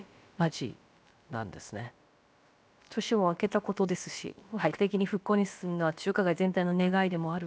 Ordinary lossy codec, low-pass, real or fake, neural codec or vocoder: none; none; fake; codec, 16 kHz, about 1 kbps, DyCAST, with the encoder's durations